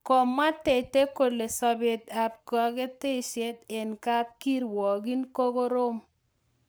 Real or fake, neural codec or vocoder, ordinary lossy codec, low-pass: fake; codec, 44.1 kHz, 7.8 kbps, Pupu-Codec; none; none